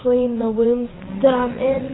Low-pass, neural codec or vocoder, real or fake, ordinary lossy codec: 7.2 kHz; vocoder, 22.05 kHz, 80 mel bands, WaveNeXt; fake; AAC, 16 kbps